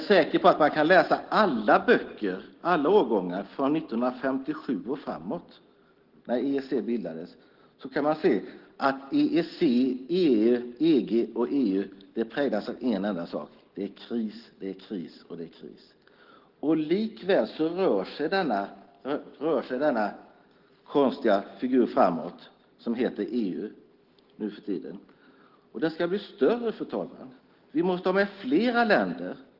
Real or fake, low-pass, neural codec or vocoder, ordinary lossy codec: real; 5.4 kHz; none; Opus, 16 kbps